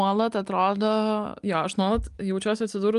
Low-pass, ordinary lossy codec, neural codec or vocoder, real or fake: 10.8 kHz; Opus, 32 kbps; none; real